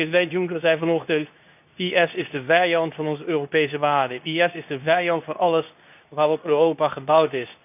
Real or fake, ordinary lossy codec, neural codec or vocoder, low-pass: fake; none; codec, 24 kHz, 0.9 kbps, WavTokenizer, medium speech release version 2; 3.6 kHz